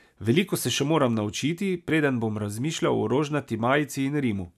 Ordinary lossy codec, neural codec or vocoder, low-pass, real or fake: none; none; 14.4 kHz; real